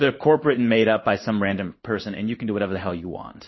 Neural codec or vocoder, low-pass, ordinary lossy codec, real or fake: codec, 16 kHz in and 24 kHz out, 1 kbps, XY-Tokenizer; 7.2 kHz; MP3, 24 kbps; fake